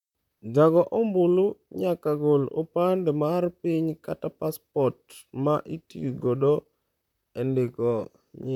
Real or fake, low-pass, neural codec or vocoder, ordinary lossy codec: fake; 19.8 kHz; vocoder, 44.1 kHz, 128 mel bands, Pupu-Vocoder; none